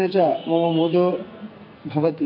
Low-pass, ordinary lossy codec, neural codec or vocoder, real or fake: 5.4 kHz; MP3, 32 kbps; codec, 44.1 kHz, 2.6 kbps, SNAC; fake